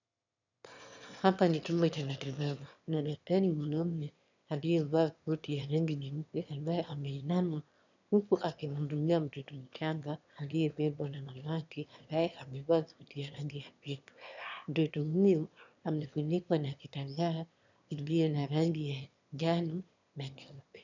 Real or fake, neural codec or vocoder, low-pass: fake; autoencoder, 22.05 kHz, a latent of 192 numbers a frame, VITS, trained on one speaker; 7.2 kHz